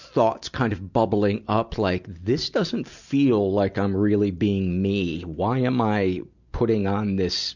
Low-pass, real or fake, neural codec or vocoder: 7.2 kHz; real; none